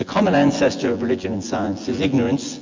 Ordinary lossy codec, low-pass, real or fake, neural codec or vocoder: MP3, 48 kbps; 7.2 kHz; fake; vocoder, 24 kHz, 100 mel bands, Vocos